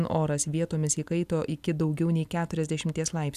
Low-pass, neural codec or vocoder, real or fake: 14.4 kHz; none; real